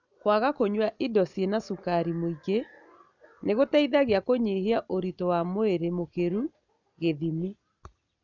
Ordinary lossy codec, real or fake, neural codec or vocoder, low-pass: none; real; none; 7.2 kHz